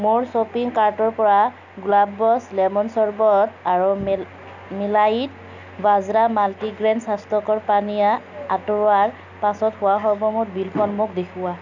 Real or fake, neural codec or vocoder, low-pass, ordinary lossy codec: real; none; 7.2 kHz; none